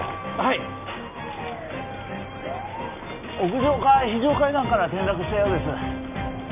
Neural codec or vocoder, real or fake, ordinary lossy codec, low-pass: none; real; none; 3.6 kHz